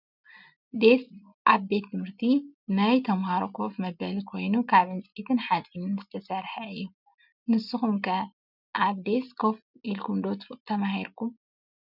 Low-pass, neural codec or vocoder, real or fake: 5.4 kHz; none; real